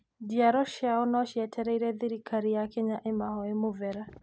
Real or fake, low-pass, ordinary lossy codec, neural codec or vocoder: real; none; none; none